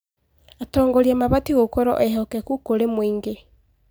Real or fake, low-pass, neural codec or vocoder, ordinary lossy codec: real; none; none; none